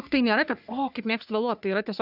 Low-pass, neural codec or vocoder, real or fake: 5.4 kHz; codec, 44.1 kHz, 3.4 kbps, Pupu-Codec; fake